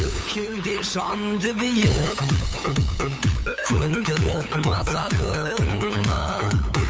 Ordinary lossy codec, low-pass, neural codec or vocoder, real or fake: none; none; codec, 16 kHz, 8 kbps, FunCodec, trained on LibriTTS, 25 frames a second; fake